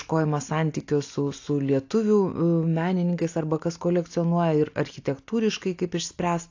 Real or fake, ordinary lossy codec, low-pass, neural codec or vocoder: real; AAC, 48 kbps; 7.2 kHz; none